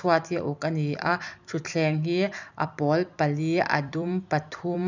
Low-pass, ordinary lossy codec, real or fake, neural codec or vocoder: 7.2 kHz; none; fake; vocoder, 44.1 kHz, 128 mel bands every 256 samples, BigVGAN v2